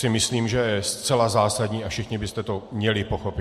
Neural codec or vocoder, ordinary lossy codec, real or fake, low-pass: none; MP3, 64 kbps; real; 14.4 kHz